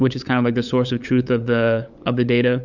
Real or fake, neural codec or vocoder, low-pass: fake; codec, 16 kHz, 8 kbps, FunCodec, trained on LibriTTS, 25 frames a second; 7.2 kHz